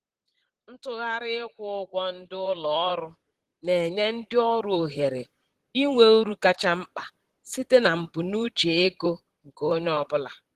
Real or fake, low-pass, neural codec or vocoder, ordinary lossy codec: fake; 14.4 kHz; vocoder, 44.1 kHz, 128 mel bands, Pupu-Vocoder; Opus, 16 kbps